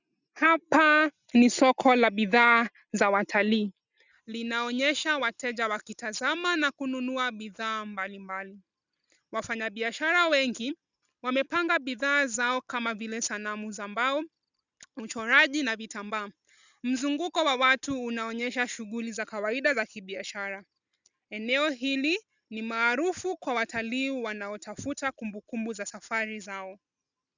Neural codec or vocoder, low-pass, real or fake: none; 7.2 kHz; real